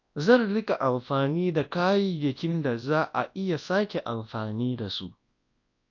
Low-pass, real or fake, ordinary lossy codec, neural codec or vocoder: 7.2 kHz; fake; AAC, 48 kbps; codec, 24 kHz, 0.9 kbps, WavTokenizer, large speech release